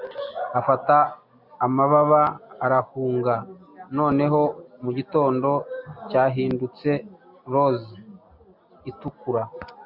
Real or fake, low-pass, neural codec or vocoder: real; 5.4 kHz; none